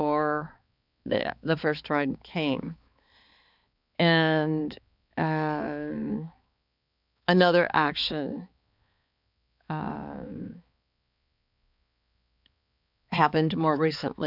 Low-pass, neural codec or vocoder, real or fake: 5.4 kHz; codec, 16 kHz, 4 kbps, X-Codec, HuBERT features, trained on balanced general audio; fake